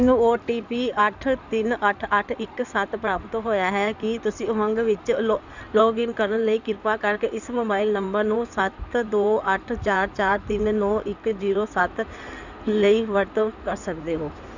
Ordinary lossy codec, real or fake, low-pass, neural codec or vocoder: none; fake; 7.2 kHz; codec, 16 kHz in and 24 kHz out, 2.2 kbps, FireRedTTS-2 codec